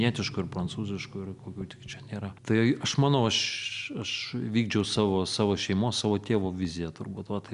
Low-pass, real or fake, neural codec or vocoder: 10.8 kHz; real; none